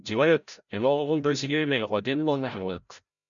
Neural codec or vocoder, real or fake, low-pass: codec, 16 kHz, 0.5 kbps, FreqCodec, larger model; fake; 7.2 kHz